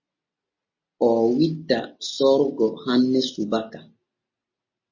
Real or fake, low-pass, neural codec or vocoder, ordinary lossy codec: real; 7.2 kHz; none; MP3, 32 kbps